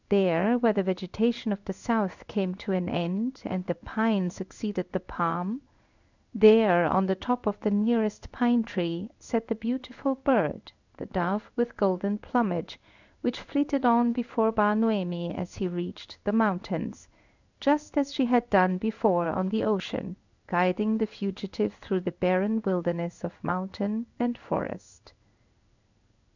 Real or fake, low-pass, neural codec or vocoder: fake; 7.2 kHz; codec, 16 kHz in and 24 kHz out, 1 kbps, XY-Tokenizer